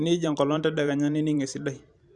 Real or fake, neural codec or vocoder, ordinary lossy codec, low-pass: fake; vocoder, 44.1 kHz, 128 mel bands every 512 samples, BigVGAN v2; Opus, 64 kbps; 10.8 kHz